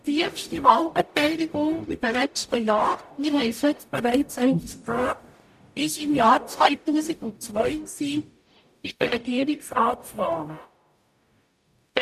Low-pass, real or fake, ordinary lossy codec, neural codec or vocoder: 14.4 kHz; fake; none; codec, 44.1 kHz, 0.9 kbps, DAC